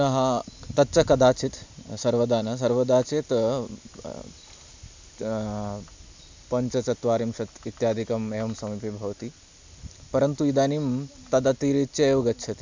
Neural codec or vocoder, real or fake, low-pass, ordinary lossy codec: none; real; 7.2 kHz; MP3, 64 kbps